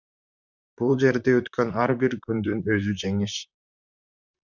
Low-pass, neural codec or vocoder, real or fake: 7.2 kHz; vocoder, 44.1 kHz, 128 mel bands, Pupu-Vocoder; fake